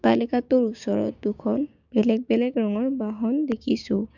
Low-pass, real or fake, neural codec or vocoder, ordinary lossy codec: 7.2 kHz; fake; codec, 16 kHz, 6 kbps, DAC; none